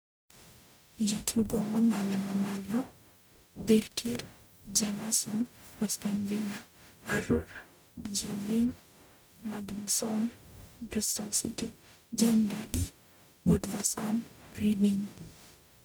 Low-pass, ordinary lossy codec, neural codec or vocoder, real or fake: none; none; codec, 44.1 kHz, 0.9 kbps, DAC; fake